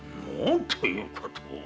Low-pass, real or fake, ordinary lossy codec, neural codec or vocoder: none; real; none; none